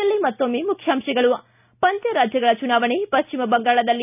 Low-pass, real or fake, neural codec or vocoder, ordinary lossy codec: 3.6 kHz; real; none; none